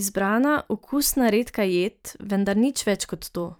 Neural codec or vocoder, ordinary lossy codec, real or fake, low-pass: vocoder, 44.1 kHz, 128 mel bands every 512 samples, BigVGAN v2; none; fake; none